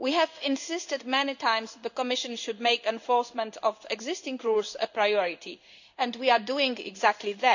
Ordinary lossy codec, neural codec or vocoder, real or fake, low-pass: none; codec, 16 kHz in and 24 kHz out, 1 kbps, XY-Tokenizer; fake; 7.2 kHz